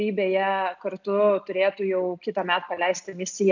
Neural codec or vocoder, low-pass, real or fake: none; 7.2 kHz; real